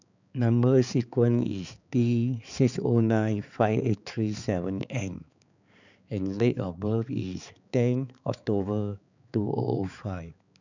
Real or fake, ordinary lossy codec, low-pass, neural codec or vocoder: fake; none; 7.2 kHz; codec, 16 kHz, 4 kbps, X-Codec, HuBERT features, trained on balanced general audio